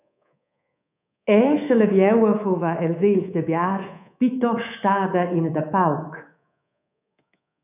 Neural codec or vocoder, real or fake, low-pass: codec, 24 kHz, 3.1 kbps, DualCodec; fake; 3.6 kHz